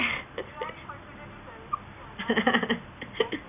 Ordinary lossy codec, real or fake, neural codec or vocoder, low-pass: none; real; none; 3.6 kHz